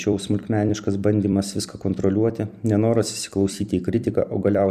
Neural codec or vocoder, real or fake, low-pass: vocoder, 44.1 kHz, 128 mel bands every 256 samples, BigVGAN v2; fake; 14.4 kHz